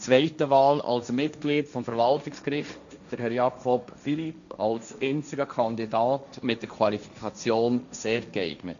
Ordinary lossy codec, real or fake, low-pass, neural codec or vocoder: none; fake; 7.2 kHz; codec, 16 kHz, 1.1 kbps, Voila-Tokenizer